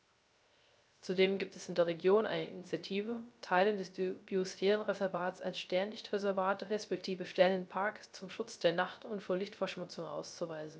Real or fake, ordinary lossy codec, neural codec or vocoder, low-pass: fake; none; codec, 16 kHz, 0.3 kbps, FocalCodec; none